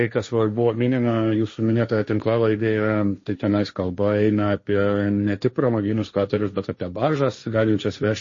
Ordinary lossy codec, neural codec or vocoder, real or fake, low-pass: MP3, 32 kbps; codec, 16 kHz, 1.1 kbps, Voila-Tokenizer; fake; 7.2 kHz